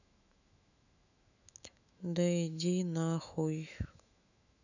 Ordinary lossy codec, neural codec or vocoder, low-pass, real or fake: none; autoencoder, 48 kHz, 128 numbers a frame, DAC-VAE, trained on Japanese speech; 7.2 kHz; fake